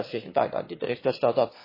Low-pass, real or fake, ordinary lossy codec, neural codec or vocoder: 5.4 kHz; fake; MP3, 24 kbps; autoencoder, 22.05 kHz, a latent of 192 numbers a frame, VITS, trained on one speaker